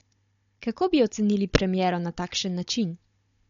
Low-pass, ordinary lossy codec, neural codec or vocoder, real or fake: 7.2 kHz; MP3, 48 kbps; codec, 16 kHz, 16 kbps, FunCodec, trained on Chinese and English, 50 frames a second; fake